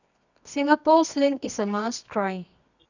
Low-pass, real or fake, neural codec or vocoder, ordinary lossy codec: 7.2 kHz; fake; codec, 24 kHz, 0.9 kbps, WavTokenizer, medium music audio release; none